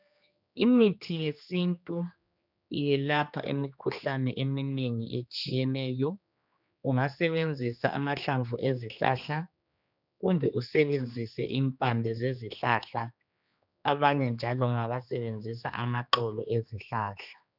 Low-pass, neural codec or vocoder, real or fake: 5.4 kHz; codec, 16 kHz, 2 kbps, X-Codec, HuBERT features, trained on general audio; fake